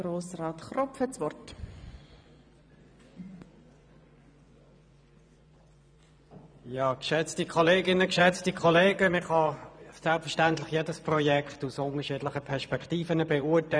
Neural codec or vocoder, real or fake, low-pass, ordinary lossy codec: vocoder, 24 kHz, 100 mel bands, Vocos; fake; 9.9 kHz; none